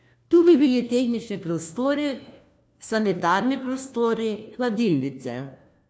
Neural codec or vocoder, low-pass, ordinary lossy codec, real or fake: codec, 16 kHz, 1 kbps, FunCodec, trained on LibriTTS, 50 frames a second; none; none; fake